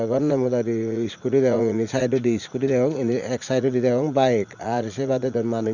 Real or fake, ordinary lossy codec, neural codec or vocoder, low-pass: fake; none; vocoder, 44.1 kHz, 80 mel bands, Vocos; 7.2 kHz